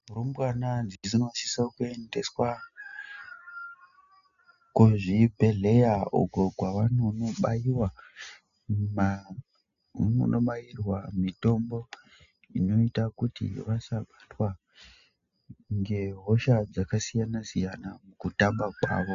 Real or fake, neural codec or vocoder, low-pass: real; none; 7.2 kHz